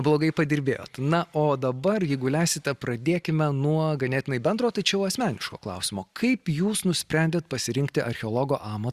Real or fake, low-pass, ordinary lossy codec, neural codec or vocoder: real; 14.4 kHz; Opus, 64 kbps; none